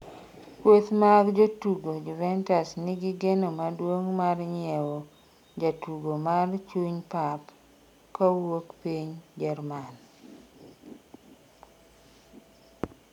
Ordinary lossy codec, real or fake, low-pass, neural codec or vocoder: none; real; 19.8 kHz; none